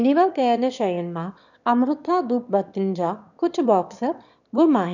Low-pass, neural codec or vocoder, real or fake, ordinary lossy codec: 7.2 kHz; autoencoder, 22.05 kHz, a latent of 192 numbers a frame, VITS, trained on one speaker; fake; none